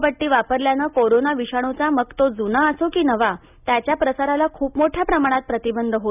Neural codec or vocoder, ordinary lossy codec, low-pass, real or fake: none; none; 3.6 kHz; real